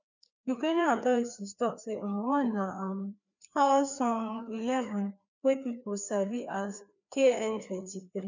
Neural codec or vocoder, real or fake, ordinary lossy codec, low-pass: codec, 16 kHz, 2 kbps, FreqCodec, larger model; fake; none; 7.2 kHz